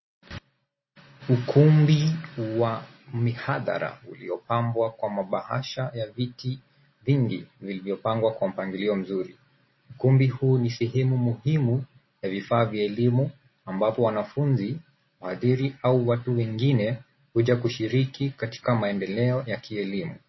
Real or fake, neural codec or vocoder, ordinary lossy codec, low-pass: real; none; MP3, 24 kbps; 7.2 kHz